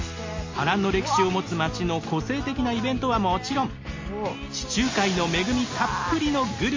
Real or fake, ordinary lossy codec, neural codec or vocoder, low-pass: real; MP3, 32 kbps; none; 7.2 kHz